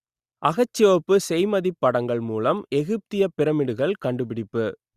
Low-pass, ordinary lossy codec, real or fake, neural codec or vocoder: 14.4 kHz; Opus, 64 kbps; real; none